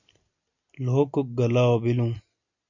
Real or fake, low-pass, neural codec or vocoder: real; 7.2 kHz; none